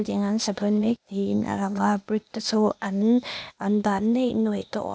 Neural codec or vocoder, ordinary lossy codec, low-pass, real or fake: codec, 16 kHz, 0.8 kbps, ZipCodec; none; none; fake